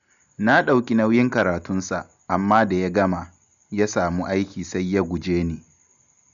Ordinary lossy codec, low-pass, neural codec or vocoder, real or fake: none; 7.2 kHz; none; real